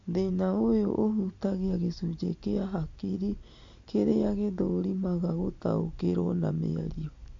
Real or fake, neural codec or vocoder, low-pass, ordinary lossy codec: real; none; 7.2 kHz; AAC, 48 kbps